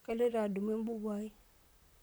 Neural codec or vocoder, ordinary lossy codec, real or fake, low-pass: vocoder, 44.1 kHz, 128 mel bands, Pupu-Vocoder; none; fake; none